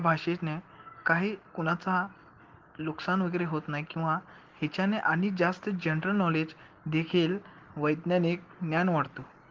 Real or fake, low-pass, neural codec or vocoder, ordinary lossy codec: real; 7.2 kHz; none; Opus, 16 kbps